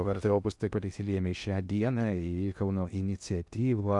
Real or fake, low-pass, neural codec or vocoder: fake; 10.8 kHz; codec, 16 kHz in and 24 kHz out, 0.6 kbps, FocalCodec, streaming, 2048 codes